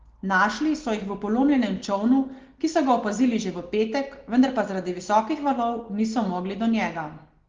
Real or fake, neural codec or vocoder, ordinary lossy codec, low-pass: real; none; Opus, 16 kbps; 7.2 kHz